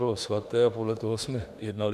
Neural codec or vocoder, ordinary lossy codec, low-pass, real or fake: autoencoder, 48 kHz, 32 numbers a frame, DAC-VAE, trained on Japanese speech; MP3, 96 kbps; 14.4 kHz; fake